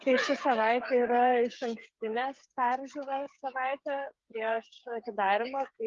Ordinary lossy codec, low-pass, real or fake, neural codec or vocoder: Opus, 32 kbps; 7.2 kHz; fake; codec, 16 kHz, 8 kbps, FreqCodec, smaller model